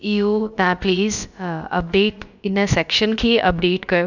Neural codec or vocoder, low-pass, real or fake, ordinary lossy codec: codec, 16 kHz, about 1 kbps, DyCAST, with the encoder's durations; 7.2 kHz; fake; none